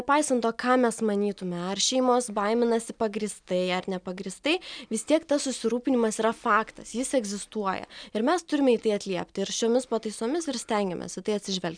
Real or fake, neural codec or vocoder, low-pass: real; none; 9.9 kHz